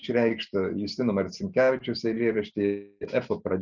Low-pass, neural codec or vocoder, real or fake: 7.2 kHz; none; real